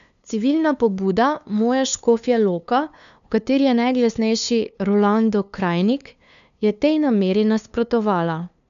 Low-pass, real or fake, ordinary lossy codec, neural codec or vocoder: 7.2 kHz; fake; none; codec, 16 kHz, 2 kbps, FunCodec, trained on LibriTTS, 25 frames a second